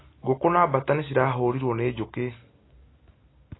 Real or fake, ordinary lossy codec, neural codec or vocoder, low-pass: real; AAC, 16 kbps; none; 7.2 kHz